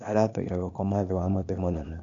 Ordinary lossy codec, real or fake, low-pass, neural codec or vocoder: none; fake; 7.2 kHz; codec, 16 kHz, 0.8 kbps, ZipCodec